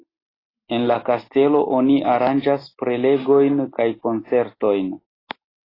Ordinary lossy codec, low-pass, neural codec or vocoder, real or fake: AAC, 24 kbps; 5.4 kHz; none; real